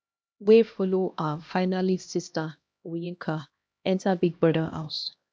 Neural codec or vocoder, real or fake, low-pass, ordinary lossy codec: codec, 16 kHz, 1 kbps, X-Codec, HuBERT features, trained on LibriSpeech; fake; none; none